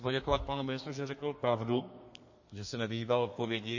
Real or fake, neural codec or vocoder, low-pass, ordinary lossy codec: fake; codec, 32 kHz, 1.9 kbps, SNAC; 7.2 kHz; MP3, 32 kbps